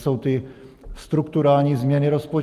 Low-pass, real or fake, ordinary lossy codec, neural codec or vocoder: 14.4 kHz; real; Opus, 32 kbps; none